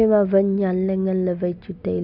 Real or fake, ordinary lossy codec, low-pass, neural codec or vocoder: real; none; 5.4 kHz; none